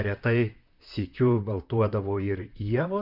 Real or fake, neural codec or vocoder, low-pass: fake; vocoder, 44.1 kHz, 128 mel bands, Pupu-Vocoder; 5.4 kHz